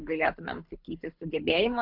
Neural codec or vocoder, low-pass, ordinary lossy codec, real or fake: codec, 24 kHz, 6 kbps, HILCodec; 5.4 kHz; AAC, 48 kbps; fake